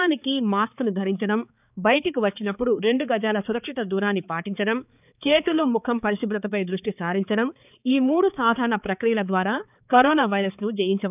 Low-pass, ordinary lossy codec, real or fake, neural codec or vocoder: 3.6 kHz; none; fake; codec, 16 kHz, 4 kbps, X-Codec, HuBERT features, trained on balanced general audio